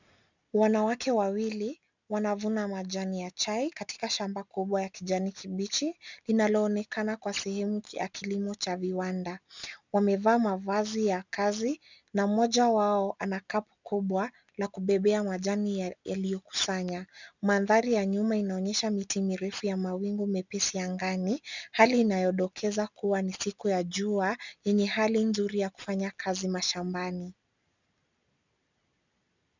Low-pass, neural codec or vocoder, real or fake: 7.2 kHz; none; real